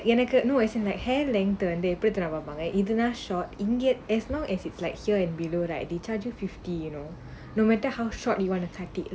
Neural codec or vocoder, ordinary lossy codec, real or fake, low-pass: none; none; real; none